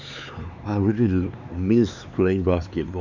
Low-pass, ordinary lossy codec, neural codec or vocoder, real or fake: 7.2 kHz; none; codec, 16 kHz, 4 kbps, X-Codec, HuBERT features, trained on LibriSpeech; fake